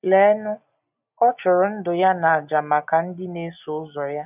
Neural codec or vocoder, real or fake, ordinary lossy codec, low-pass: none; real; none; 3.6 kHz